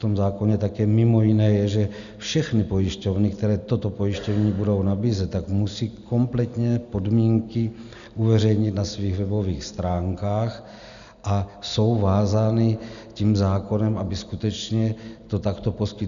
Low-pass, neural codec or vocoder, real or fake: 7.2 kHz; none; real